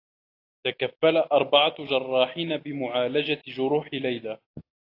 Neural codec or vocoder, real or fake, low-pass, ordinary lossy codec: none; real; 5.4 kHz; AAC, 32 kbps